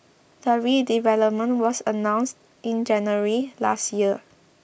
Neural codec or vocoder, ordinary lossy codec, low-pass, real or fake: none; none; none; real